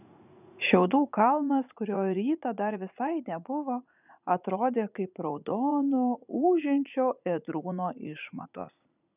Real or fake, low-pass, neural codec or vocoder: fake; 3.6 kHz; vocoder, 44.1 kHz, 80 mel bands, Vocos